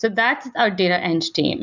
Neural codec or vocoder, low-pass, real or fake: none; 7.2 kHz; real